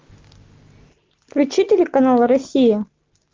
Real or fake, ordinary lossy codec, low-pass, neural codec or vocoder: real; Opus, 24 kbps; 7.2 kHz; none